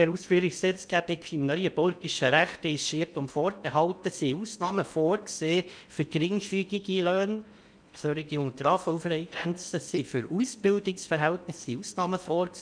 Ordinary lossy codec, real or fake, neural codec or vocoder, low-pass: none; fake; codec, 16 kHz in and 24 kHz out, 0.8 kbps, FocalCodec, streaming, 65536 codes; 9.9 kHz